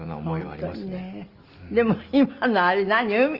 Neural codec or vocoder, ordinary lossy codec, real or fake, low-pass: none; Opus, 24 kbps; real; 5.4 kHz